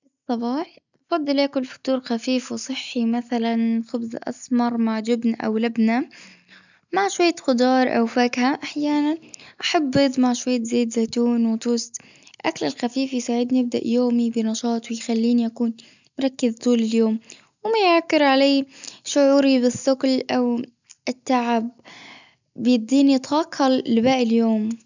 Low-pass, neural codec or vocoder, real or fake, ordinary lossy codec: 7.2 kHz; none; real; none